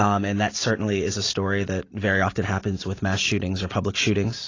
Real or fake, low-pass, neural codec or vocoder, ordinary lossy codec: real; 7.2 kHz; none; AAC, 32 kbps